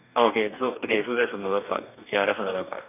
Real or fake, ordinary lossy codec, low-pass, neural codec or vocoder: fake; none; 3.6 kHz; codec, 44.1 kHz, 2.6 kbps, DAC